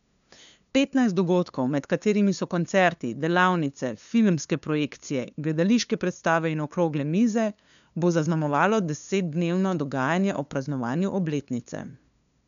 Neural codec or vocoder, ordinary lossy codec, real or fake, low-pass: codec, 16 kHz, 2 kbps, FunCodec, trained on LibriTTS, 25 frames a second; none; fake; 7.2 kHz